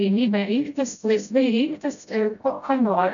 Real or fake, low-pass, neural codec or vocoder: fake; 7.2 kHz; codec, 16 kHz, 0.5 kbps, FreqCodec, smaller model